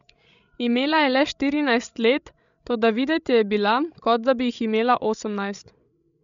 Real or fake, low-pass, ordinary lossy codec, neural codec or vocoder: fake; 7.2 kHz; none; codec, 16 kHz, 16 kbps, FreqCodec, larger model